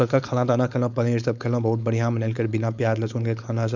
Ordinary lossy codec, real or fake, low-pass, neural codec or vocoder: none; fake; 7.2 kHz; codec, 16 kHz, 4.8 kbps, FACodec